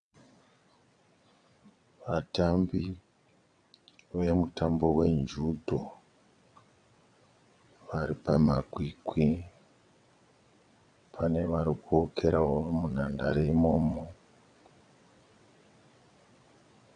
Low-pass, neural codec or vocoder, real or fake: 9.9 kHz; vocoder, 22.05 kHz, 80 mel bands, WaveNeXt; fake